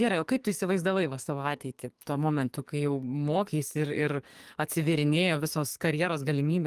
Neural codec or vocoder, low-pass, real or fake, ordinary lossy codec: codec, 44.1 kHz, 2.6 kbps, SNAC; 14.4 kHz; fake; Opus, 32 kbps